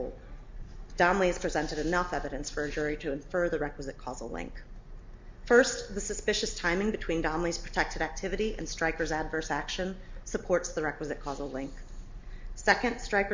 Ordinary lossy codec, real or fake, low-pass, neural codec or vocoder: MP3, 64 kbps; real; 7.2 kHz; none